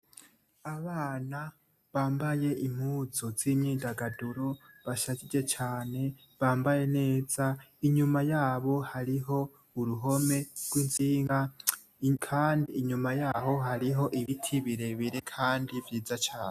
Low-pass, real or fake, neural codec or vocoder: 14.4 kHz; real; none